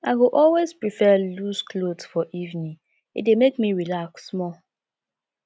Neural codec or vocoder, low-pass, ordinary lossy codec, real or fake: none; none; none; real